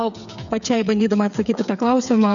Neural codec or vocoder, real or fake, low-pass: codec, 16 kHz, 8 kbps, FreqCodec, smaller model; fake; 7.2 kHz